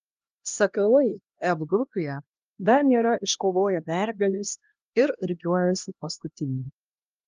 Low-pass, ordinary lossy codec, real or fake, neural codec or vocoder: 7.2 kHz; Opus, 32 kbps; fake; codec, 16 kHz, 1 kbps, X-Codec, HuBERT features, trained on LibriSpeech